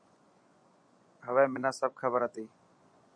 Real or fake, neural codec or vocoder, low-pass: fake; vocoder, 24 kHz, 100 mel bands, Vocos; 9.9 kHz